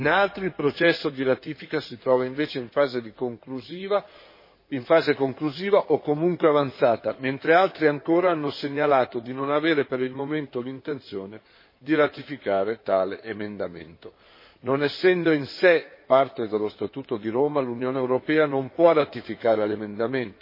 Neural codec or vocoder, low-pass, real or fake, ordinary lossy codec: codec, 16 kHz in and 24 kHz out, 2.2 kbps, FireRedTTS-2 codec; 5.4 kHz; fake; MP3, 24 kbps